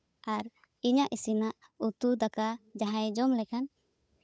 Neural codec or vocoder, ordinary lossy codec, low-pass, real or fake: codec, 16 kHz, 8 kbps, FunCodec, trained on Chinese and English, 25 frames a second; none; none; fake